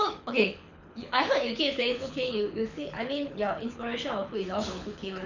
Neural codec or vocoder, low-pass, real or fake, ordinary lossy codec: codec, 24 kHz, 6 kbps, HILCodec; 7.2 kHz; fake; none